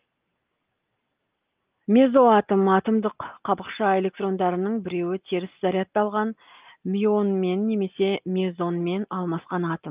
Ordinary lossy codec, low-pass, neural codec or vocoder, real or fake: Opus, 32 kbps; 3.6 kHz; none; real